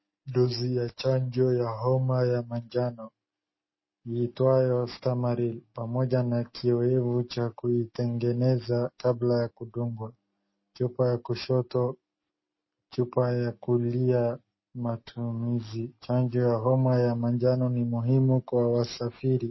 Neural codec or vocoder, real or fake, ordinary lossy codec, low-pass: none; real; MP3, 24 kbps; 7.2 kHz